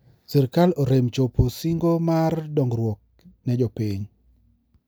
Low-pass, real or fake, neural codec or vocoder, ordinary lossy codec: none; real; none; none